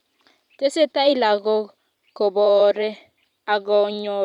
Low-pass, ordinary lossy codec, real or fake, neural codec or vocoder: 19.8 kHz; none; fake; vocoder, 44.1 kHz, 128 mel bands every 512 samples, BigVGAN v2